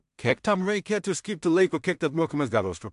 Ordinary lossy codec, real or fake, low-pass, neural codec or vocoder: MP3, 64 kbps; fake; 10.8 kHz; codec, 16 kHz in and 24 kHz out, 0.4 kbps, LongCat-Audio-Codec, two codebook decoder